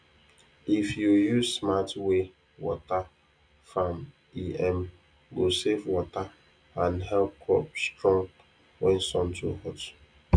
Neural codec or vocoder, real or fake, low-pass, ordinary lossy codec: none; real; 9.9 kHz; none